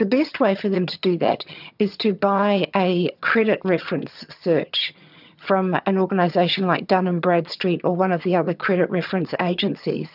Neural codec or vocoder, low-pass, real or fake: vocoder, 22.05 kHz, 80 mel bands, HiFi-GAN; 5.4 kHz; fake